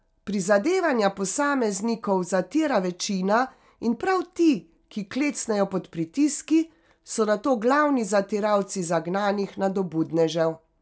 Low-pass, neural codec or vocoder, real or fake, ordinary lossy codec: none; none; real; none